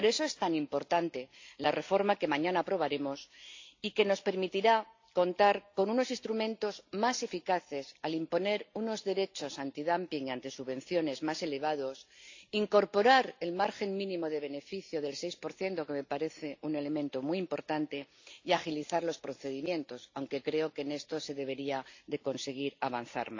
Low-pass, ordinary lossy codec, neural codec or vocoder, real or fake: 7.2 kHz; AAC, 48 kbps; none; real